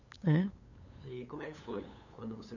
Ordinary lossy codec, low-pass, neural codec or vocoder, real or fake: none; 7.2 kHz; codec, 16 kHz, 8 kbps, FunCodec, trained on LibriTTS, 25 frames a second; fake